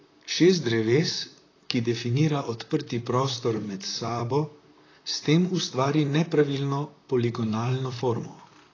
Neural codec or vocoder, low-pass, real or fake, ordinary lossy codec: vocoder, 44.1 kHz, 128 mel bands, Pupu-Vocoder; 7.2 kHz; fake; AAC, 32 kbps